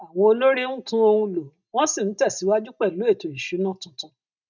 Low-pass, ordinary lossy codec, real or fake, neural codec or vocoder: 7.2 kHz; none; real; none